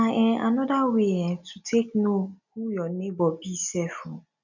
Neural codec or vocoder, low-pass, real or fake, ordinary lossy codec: none; 7.2 kHz; real; none